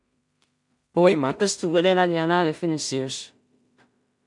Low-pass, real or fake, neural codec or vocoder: 10.8 kHz; fake; codec, 16 kHz in and 24 kHz out, 0.4 kbps, LongCat-Audio-Codec, two codebook decoder